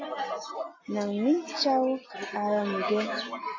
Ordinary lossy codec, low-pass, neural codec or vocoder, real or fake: AAC, 32 kbps; 7.2 kHz; none; real